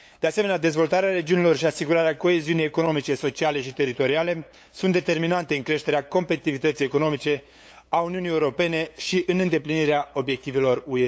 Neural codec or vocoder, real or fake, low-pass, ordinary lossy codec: codec, 16 kHz, 8 kbps, FunCodec, trained on LibriTTS, 25 frames a second; fake; none; none